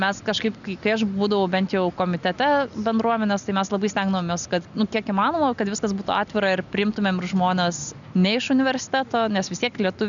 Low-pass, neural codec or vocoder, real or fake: 7.2 kHz; none; real